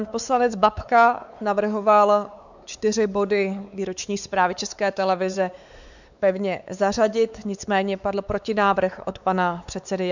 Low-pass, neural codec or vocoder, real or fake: 7.2 kHz; codec, 16 kHz, 4 kbps, X-Codec, WavLM features, trained on Multilingual LibriSpeech; fake